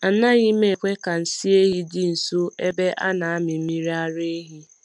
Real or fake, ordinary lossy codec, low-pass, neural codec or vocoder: real; MP3, 96 kbps; 10.8 kHz; none